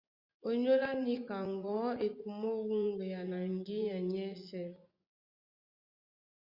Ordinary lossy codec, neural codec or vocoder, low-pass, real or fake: Opus, 64 kbps; vocoder, 24 kHz, 100 mel bands, Vocos; 5.4 kHz; fake